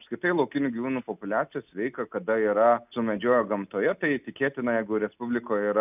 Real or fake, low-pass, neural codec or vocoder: real; 3.6 kHz; none